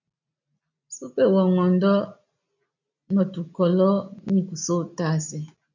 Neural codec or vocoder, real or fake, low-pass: none; real; 7.2 kHz